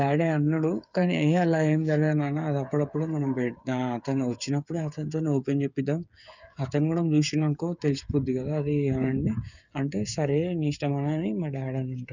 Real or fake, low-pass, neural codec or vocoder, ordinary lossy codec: fake; 7.2 kHz; codec, 16 kHz, 8 kbps, FreqCodec, smaller model; none